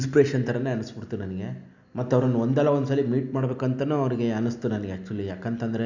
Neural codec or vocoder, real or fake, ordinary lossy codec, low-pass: none; real; none; 7.2 kHz